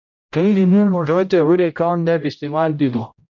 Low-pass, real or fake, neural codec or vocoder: 7.2 kHz; fake; codec, 16 kHz, 0.5 kbps, X-Codec, HuBERT features, trained on general audio